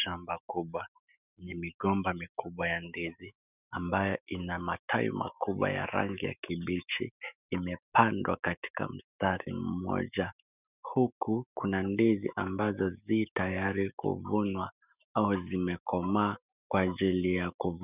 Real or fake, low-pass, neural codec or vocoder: fake; 3.6 kHz; autoencoder, 48 kHz, 128 numbers a frame, DAC-VAE, trained on Japanese speech